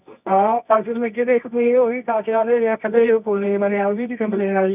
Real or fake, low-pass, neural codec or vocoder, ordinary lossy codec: fake; 3.6 kHz; codec, 24 kHz, 0.9 kbps, WavTokenizer, medium music audio release; none